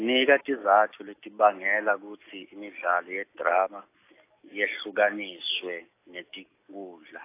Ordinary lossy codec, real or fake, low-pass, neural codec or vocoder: AAC, 24 kbps; real; 3.6 kHz; none